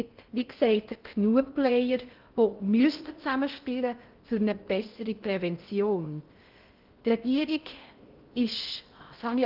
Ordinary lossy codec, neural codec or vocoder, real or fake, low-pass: Opus, 32 kbps; codec, 16 kHz in and 24 kHz out, 0.6 kbps, FocalCodec, streaming, 2048 codes; fake; 5.4 kHz